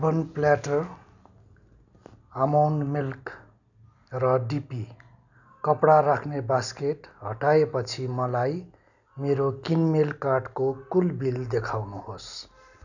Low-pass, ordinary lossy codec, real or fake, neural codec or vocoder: 7.2 kHz; none; real; none